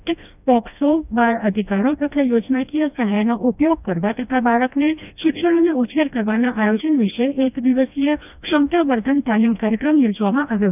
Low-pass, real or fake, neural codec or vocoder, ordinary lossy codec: 3.6 kHz; fake; codec, 16 kHz, 1 kbps, FreqCodec, smaller model; none